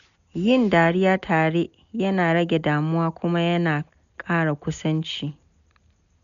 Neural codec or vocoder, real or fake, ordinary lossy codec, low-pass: none; real; none; 7.2 kHz